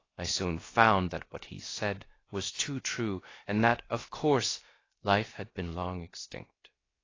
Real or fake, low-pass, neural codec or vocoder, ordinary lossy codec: fake; 7.2 kHz; codec, 16 kHz, about 1 kbps, DyCAST, with the encoder's durations; AAC, 32 kbps